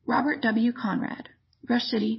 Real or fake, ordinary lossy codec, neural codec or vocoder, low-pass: fake; MP3, 24 kbps; vocoder, 22.05 kHz, 80 mel bands, Vocos; 7.2 kHz